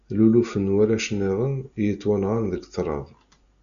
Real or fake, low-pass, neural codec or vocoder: real; 7.2 kHz; none